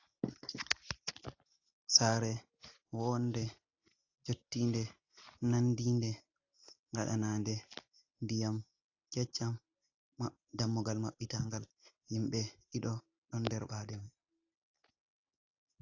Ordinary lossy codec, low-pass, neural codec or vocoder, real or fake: AAC, 48 kbps; 7.2 kHz; none; real